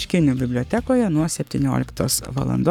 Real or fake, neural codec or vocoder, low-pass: fake; codec, 44.1 kHz, 7.8 kbps, Pupu-Codec; 19.8 kHz